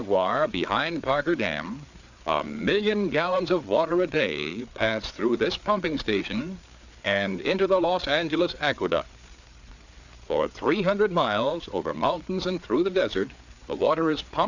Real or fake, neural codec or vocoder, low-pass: fake; codec, 16 kHz, 4 kbps, FreqCodec, larger model; 7.2 kHz